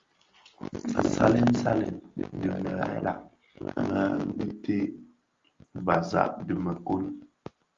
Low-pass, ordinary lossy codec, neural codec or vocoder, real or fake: 7.2 kHz; Opus, 32 kbps; none; real